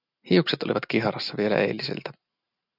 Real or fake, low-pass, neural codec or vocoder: real; 5.4 kHz; none